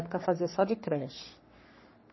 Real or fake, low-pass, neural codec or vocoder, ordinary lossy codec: fake; 7.2 kHz; codec, 44.1 kHz, 3.4 kbps, Pupu-Codec; MP3, 24 kbps